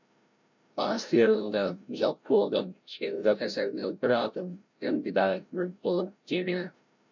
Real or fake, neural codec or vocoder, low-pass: fake; codec, 16 kHz, 0.5 kbps, FreqCodec, larger model; 7.2 kHz